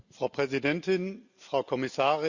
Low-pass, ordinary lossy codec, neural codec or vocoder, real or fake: 7.2 kHz; Opus, 64 kbps; none; real